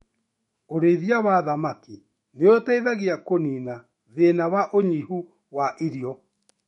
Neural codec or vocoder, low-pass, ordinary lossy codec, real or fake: autoencoder, 48 kHz, 128 numbers a frame, DAC-VAE, trained on Japanese speech; 19.8 kHz; MP3, 48 kbps; fake